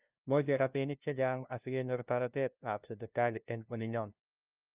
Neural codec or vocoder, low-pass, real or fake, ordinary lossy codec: codec, 16 kHz, 0.5 kbps, FunCodec, trained on LibriTTS, 25 frames a second; 3.6 kHz; fake; Opus, 24 kbps